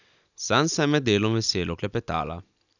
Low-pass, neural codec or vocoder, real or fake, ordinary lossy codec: 7.2 kHz; none; real; none